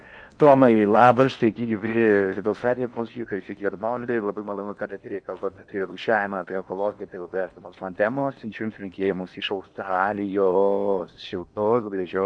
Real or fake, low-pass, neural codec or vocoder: fake; 9.9 kHz; codec, 16 kHz in and 24 kHz out, 0.8 kbps, FocalCodec, streaming, 65536 codes